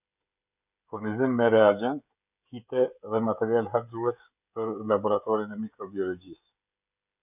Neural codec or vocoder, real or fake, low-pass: codec, 16 kHz, 16 kbps, FreqCodec, smaller model; fake; 3.6 kHz